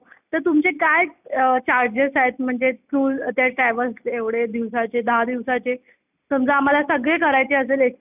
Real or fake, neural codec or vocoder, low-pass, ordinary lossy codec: real; none; 3.6 kHz; none